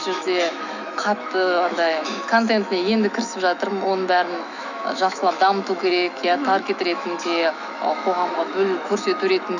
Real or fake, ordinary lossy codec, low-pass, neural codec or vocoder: fake; none; 7.2 kHz; autoencoder, 48 kHz, 128 numbers a frame, DAC-VAE, trained on Japanese speech